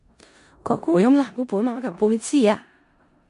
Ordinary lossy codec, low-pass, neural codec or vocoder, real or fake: MP3, 64 kbps; 10.8 kHz; codec, 16 kHz in and 24 kHz out, 0.4 kbps, LongCat-Audio-Codec, four codebook decoder; fake